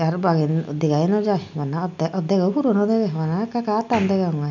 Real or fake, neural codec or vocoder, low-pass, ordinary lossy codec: real; none; 7.2 kHz; none